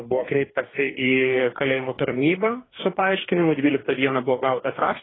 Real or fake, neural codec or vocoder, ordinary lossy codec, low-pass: fake; codec, 44.1 kHz, 2.6 kbps, DAC; AAC, 16 kbps; 7.2 kHz